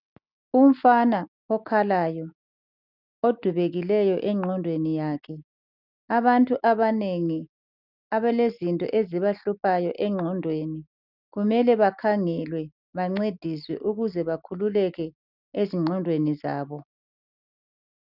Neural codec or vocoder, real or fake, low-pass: none; real; 5.4 kHz